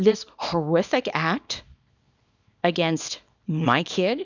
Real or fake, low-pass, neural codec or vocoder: fake; 7.2 kHz; codec, 24 kHz, 0.9 kbps, WavTokenizer, small release